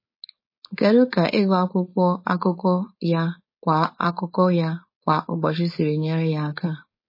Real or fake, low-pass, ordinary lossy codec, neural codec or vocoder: fake; 5.4 kHz; MP3, 24 kbps; codec, 16 kHz, 4.8 kbps, FACodec